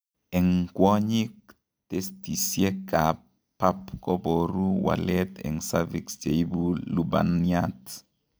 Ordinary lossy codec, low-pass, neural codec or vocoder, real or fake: none; none; none; real